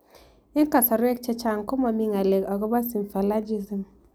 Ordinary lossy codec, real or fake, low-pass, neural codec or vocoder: none; real; none; none